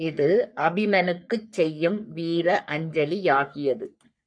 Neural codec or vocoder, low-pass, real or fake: codec, 44.1 kHz, 3.4 kbps, Pupu-Codec; 9.9 kHz; fake